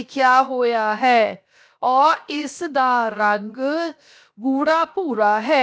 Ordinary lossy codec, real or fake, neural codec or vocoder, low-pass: none; fake; codec, 16 kHz, 0.7 kbps, FocalCodec; none